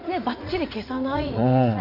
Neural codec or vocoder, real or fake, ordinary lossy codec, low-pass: vocoder, 44.1 kHz, 80 mel bands, Vocos; fake; none; 5.4 kHz